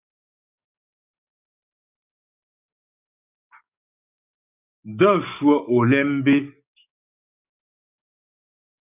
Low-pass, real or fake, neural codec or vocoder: 3.6 kHz; fake; codec, 44.1 kHz, 7.8 kbps, DAC